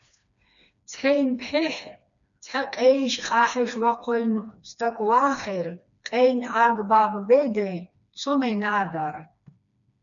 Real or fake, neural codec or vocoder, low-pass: fake; codec, 16 kHz, 2 kbps, FreqCodec, smaller model; 7.2 kHz